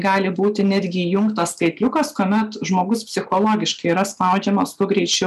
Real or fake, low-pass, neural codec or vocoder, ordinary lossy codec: real; 14.4 kHz; none; MP3, 96 kbps